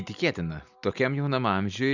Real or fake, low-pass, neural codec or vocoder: real; 7.2 kHz; none